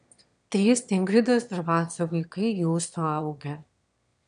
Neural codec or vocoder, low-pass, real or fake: autoencoder, 22.05 kHz, a latent of 192 numbers a frame, VITS, trained on one speaker; 9.9 kHz; fake